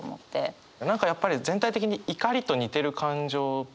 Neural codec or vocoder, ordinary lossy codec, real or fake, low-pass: none; none; real; none